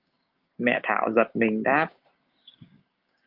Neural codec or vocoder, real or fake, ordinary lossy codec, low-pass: codec, 16 kHz, 6 kbps, DAC; fake; Opus, 32 kbps; 5.4 kHz